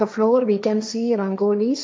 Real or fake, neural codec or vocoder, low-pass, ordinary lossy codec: fake; codec, 16 kHz, 1.1 kbps, Voila-Tokenizer; none; none